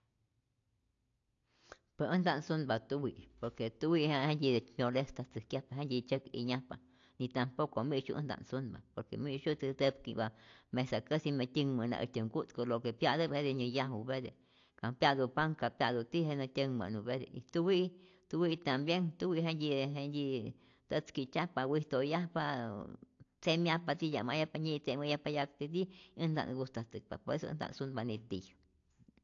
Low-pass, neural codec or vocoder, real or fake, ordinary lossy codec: 7.2 kHz; none; real; AAC, 48 kbps